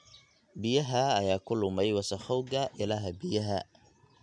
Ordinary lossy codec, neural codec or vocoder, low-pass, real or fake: MP3, 96 kbps; none; 9.9 kHz; real